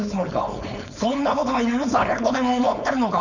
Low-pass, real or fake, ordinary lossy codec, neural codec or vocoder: 7.2 kHz; fake; none; codec, 16 kHz, 4.8 kbps, FACodec